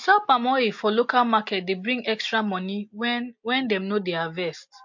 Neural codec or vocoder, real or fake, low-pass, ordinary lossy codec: none; real; 7.2 kHz; MP3, 48 kbps